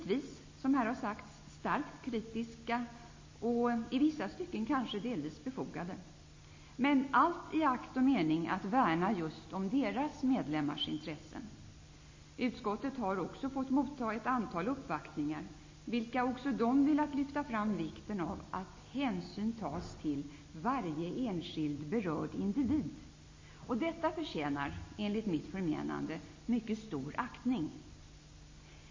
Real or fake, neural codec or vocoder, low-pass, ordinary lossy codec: real; none; 7.2 kHz; MP3, 32 kbps